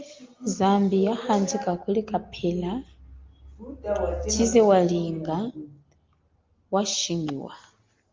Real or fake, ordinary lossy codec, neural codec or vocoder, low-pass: real; Opus, 32 kbps; none; 7.2 kHz